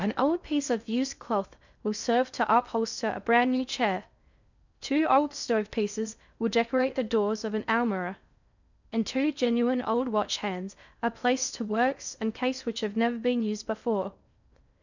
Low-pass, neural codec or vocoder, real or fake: 7.2 kHz; codec, 16 kHz in and 24 kHz out, 0.6 kbps, FocalCodec, streaming, 2048 codes; fake